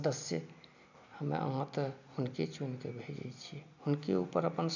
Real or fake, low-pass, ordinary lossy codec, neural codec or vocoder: real; 7.2 kHz; none; none